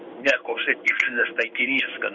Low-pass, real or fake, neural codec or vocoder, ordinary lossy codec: 7.2 kHz; fake; codec, 16 kHz in and 24 kHz out, 1 kbps, XY-Tokenizer; Opus, 64 kbps